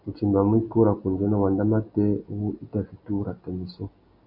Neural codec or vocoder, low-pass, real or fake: none; 5.4 kHz; real